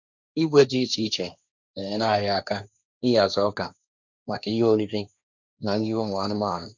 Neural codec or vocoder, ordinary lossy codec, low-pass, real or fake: codec, 16 kHz, 1.1 kbps, Voila-Tokenizer; none; 7.2 kHz; fake